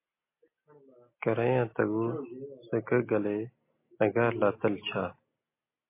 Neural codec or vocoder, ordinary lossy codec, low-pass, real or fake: none; MP3, 24 kbps; 3.6 kHz; real